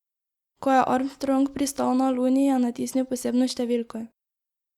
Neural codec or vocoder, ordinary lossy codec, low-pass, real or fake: none; Opus, 64 kbps; 19.8 kHz; real